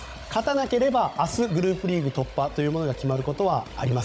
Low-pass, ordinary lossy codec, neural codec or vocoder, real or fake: none; none; codec, 16 kHz, 16 kbps, FunCodec, trained on Chinese and English, 50 frames a second; fake